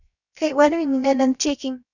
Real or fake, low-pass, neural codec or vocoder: fake; 7.2 kHz; codec, 16 kHz, 0.7 kbps, FocalCodec